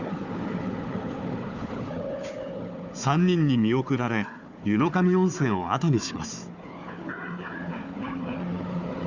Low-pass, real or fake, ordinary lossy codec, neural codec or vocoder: 7.2 kHz; fake; none; codec, 16 kHz, 4 kbps, FunCodec, trained on Chinese and English, 50 frames a second